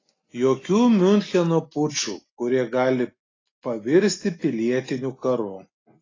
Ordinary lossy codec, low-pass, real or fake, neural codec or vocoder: AAC, 32 kbps; 7.2 kHz; real; none